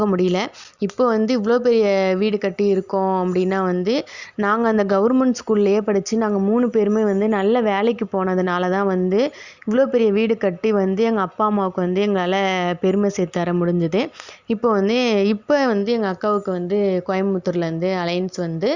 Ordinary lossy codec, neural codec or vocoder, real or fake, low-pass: Opus, 64 kbps; none; real; 7.2 kHz